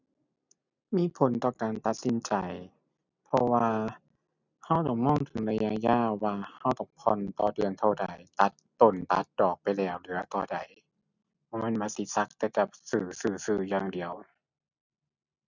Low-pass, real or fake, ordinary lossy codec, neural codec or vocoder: 7.2 kHz; real; none; none